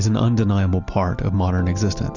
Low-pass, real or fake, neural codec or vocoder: 7.2 kHz; real; none